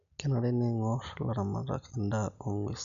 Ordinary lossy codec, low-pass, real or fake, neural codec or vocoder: none; 7.2 kHz; real; none